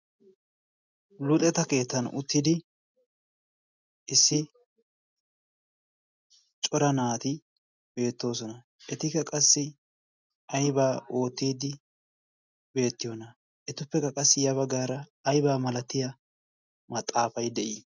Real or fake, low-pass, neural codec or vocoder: real; 7.2 kHz; none